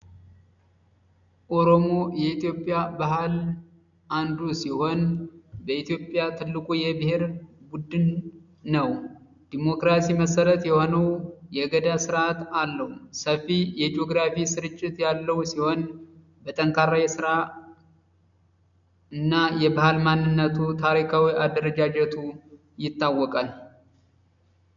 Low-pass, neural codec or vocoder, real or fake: 7.2 kHz; none; real